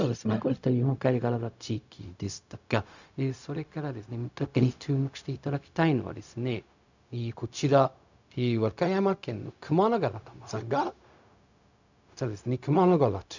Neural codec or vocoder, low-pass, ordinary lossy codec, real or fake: codec, 16 kHz, 0.4 kbps, LongCat-Audio-Codec; 7.2 kHz; none; fake